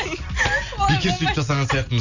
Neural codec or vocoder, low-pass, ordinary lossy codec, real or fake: none; 7.2 kHz; none; real